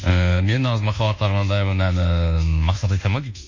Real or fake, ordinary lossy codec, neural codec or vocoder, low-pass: fake; MP3, 64 kbps; codec, 24 kHz, 1.2 kbps, DualCodec; 7.2 kHz